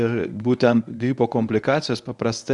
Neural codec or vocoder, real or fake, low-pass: codec, 24 kHz, 0.9 kbps, WavTokenizer, medium speech release version 1; fake; 10.8 kHz